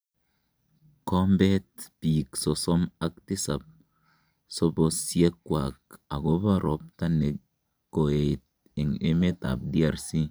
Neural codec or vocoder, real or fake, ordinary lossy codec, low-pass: none; real; none; none